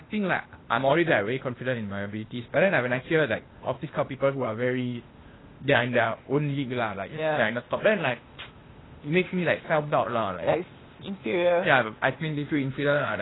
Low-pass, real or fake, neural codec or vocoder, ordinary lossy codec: 7.2 kHz; fake; codec, 16 kHz, 0.8 kbps, ZipCodec; AAC, 16 kbps